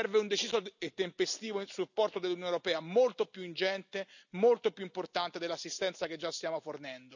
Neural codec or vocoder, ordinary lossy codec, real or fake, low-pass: none; none; real; 7.2 kHz